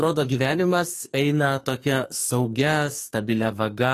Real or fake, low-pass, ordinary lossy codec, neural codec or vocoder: fake; 14.4 kHz; AAC, 48 kbps; codec, 44.1 kHz, 2.6 kbps, SNAC